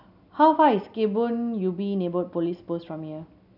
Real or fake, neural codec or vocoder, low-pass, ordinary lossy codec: real; none; 5.4 kHz; none